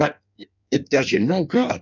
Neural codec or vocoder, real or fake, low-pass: codec, 16 kHz in and 24 kHz out, 1.1 kbps, FireRedTTS-2 codec; fake; 7.2 kHz